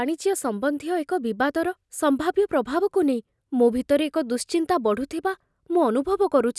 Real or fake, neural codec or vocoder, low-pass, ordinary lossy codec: real; none; none; none